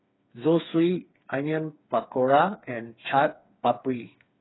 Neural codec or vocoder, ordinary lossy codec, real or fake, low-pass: codec, 16 kHz, 4 kbps, FreqCodec, smaller model; AAC, 16 kbps; fake; 7.2 kHz